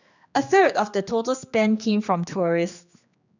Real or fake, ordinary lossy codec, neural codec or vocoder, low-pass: fake; none; codec, 16 kHz, 2 kbps, X-Codec, HuBERT features, trained on general audio; 7.2 kHz